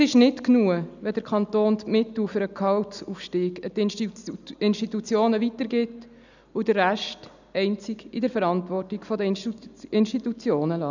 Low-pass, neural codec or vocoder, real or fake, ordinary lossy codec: 7.2 kHz; none; real; none